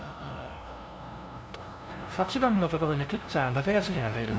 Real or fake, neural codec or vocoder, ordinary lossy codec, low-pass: fake; codec, 16 kHz, 0.5 kbps, FunCodec, trained on LibriTTS, 25 frames a second; none; none